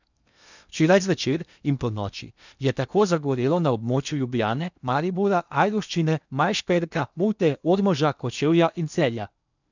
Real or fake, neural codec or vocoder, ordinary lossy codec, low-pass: fake; codec, 16 kHz in and 24 kHz out, 0.8 kbps, FocalCodec, streaming, 65536 codes; none; 7.2 kHz